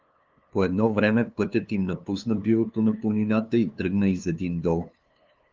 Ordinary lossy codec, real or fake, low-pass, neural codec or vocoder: Opus, 32 kbps; fake; 7.2 kHz; codec, 16 kHz, 2 kbps, FunCodec, trained on LibriTTS, 25 frames a second